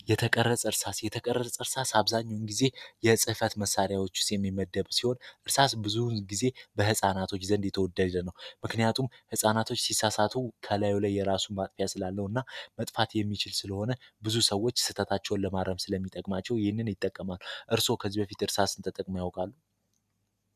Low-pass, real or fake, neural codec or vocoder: 14.4 kHz; real; none